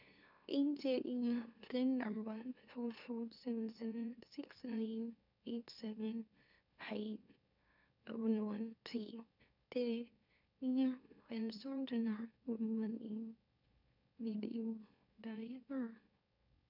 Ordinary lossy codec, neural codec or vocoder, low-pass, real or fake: none; autoencoder, 44.1 kHz, a latent of 192 numbers a frame, MeloTTS; 5.4 kHz; fake